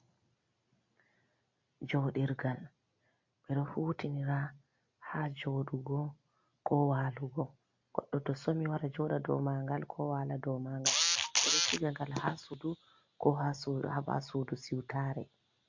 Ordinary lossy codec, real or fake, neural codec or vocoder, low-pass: MP3, 48 kbps; real; none; 7.2 kHz